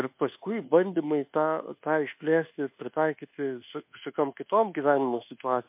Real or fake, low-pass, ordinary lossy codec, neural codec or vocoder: fake; 3.6 kHz; MP3, 32 kbps; codec, 24 kHz, 1.2 kbps, DualCodec